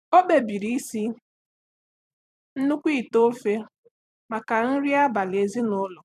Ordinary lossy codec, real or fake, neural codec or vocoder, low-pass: none; fake; vocoder, 44.1 kHz, 128 mel bands every 256 samples, BigVGAN v2; 14.4 kHz